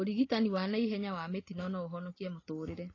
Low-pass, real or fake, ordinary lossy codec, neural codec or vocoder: 7.2 kHz; real; AAC, 32 kbps; none